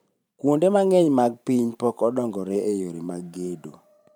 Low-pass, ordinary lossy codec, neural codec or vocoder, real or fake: none; none; vocoder, 44.1 kHz, 128 mel bands every 512 samples, BigVGAN v2; fake